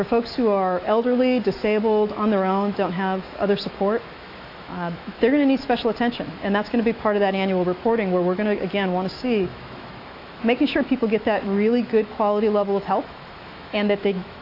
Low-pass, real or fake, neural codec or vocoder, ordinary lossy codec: 5.4 kHz; fake; autoencoder, 48 kHz, 128 numbers a frame, DAC-VAE, trained on Japanese speech; AAC, 32 kbps